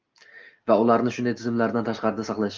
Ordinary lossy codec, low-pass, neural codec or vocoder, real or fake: Opus, 32 kbps; 7.2 kHz; none; real